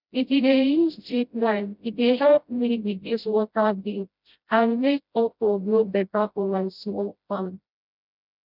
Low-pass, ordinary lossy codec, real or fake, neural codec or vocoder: 5.4 kHz; none; fake; codec, 16 kHz, 0.5 kbps, FreqCodec, smaller model